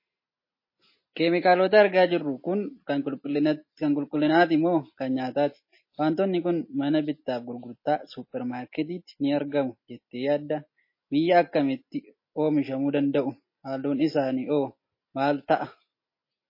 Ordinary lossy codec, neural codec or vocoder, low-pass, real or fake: MP3, 24 kbps; none; 5.4 kHz; real